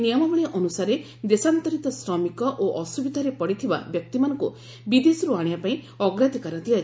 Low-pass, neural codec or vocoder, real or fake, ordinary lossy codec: none; none; real; none